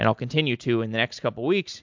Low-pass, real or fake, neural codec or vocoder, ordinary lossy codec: 7.2 kHz; real; none; MP3, 64 kbps